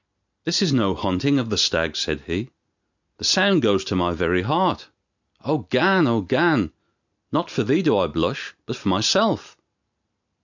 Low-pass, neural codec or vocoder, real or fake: 7.2 kHz; none; real